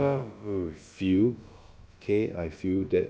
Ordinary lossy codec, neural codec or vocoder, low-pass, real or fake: none; codec, 16 kHz, about 1 kbps, DyCAST, with the encoder's durations; none; fake